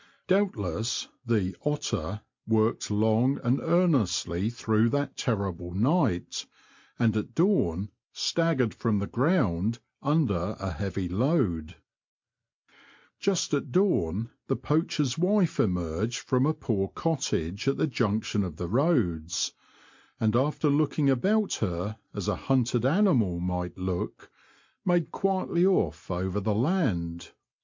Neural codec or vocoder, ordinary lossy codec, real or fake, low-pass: none; MP3, 48 kbps; real; 7.2 kHz